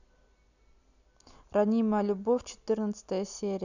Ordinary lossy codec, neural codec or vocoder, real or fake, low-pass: none; none; real; 7.2 kHz